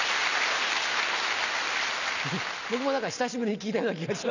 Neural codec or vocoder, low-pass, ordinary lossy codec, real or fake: none; 7.2 kHz; none; real